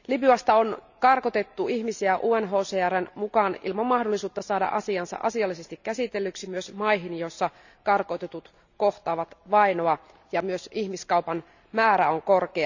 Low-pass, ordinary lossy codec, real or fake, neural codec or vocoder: 7.2 kHz; none; real; none